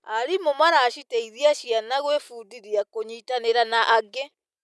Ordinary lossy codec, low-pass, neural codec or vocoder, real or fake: none; none; none; real